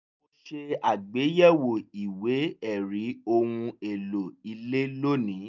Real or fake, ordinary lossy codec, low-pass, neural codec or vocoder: real; none; 7.2 kHz; none